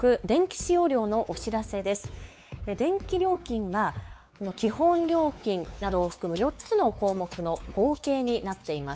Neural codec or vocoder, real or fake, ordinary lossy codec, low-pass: codec, 16 kHz, 4 kbps, X-Codec, WavLM features, trained on Multilingual LibriSpeech; fake; none; none